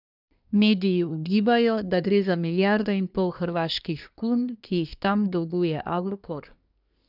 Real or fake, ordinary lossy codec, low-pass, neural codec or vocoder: fake; none; 5.4 kHz; codec, 24 kHz, 1 kbps, SNAC